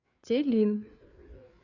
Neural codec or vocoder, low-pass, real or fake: codec, 16 kHz, 4 kbps, FreqCodec, larger model; 7.2 kHz; fake